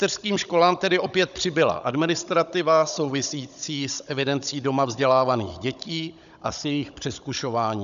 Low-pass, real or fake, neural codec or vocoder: 7.2 kHz; fake; codec, 16 kHz, 16 kbps, FunCodec, trained on Chinese and English, 50 frames a second